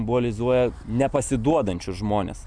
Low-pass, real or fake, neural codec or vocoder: 9.9 kHz; real; none